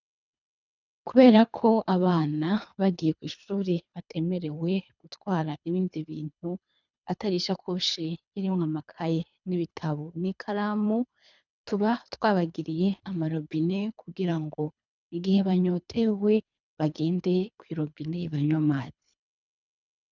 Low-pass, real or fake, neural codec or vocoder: 7.2 kHz; fake; codec, 24 kHz, 3 kbps, HILCodec